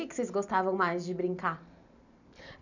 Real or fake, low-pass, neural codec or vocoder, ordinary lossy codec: real; 7.2 kHz; none; none